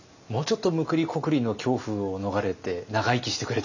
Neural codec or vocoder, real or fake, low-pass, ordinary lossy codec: none; real; 7.2 kHz; none